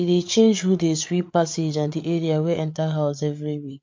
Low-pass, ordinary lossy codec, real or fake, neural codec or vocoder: 7.2 kHz; MP3, 48 kbps; fake; codec, 16 kHz, 8 kbps, FreqCodec, larger model